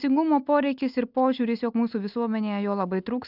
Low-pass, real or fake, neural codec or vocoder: 5.4 kHz; real; none